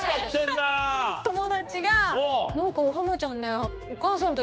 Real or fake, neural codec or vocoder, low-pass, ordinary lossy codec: fake; codec, 16 kHz, 2 kbps, X-Codec, HuBERT features, trained on general audio; none; none